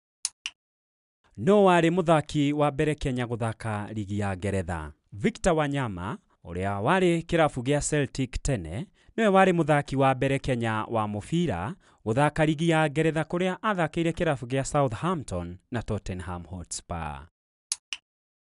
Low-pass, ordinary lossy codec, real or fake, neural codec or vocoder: 10.8 kHz; none; real; none